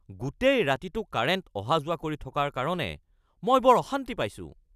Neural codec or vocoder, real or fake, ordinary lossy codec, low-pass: none; real; none; 14.4 kHz